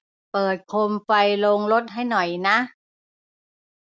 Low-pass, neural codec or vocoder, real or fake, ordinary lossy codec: none; none; real; none